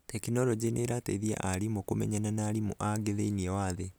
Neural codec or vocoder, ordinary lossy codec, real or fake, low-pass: none; none; real; none